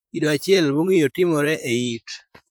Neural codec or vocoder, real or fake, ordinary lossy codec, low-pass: vocoder, 44.1 kHz, 128 mel bands, Pupu-Vocoder; fake; none; none